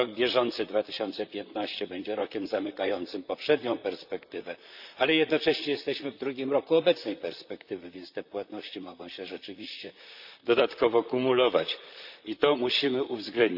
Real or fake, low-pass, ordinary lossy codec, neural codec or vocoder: fake; 5.4 kHz; none; vocoder, 44.1 kHz, 128 mel bands, Pupu-Vocoder